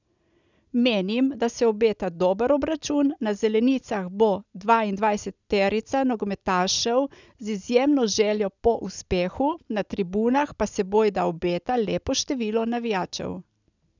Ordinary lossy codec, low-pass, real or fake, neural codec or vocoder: none; 7.2 kHz; real; none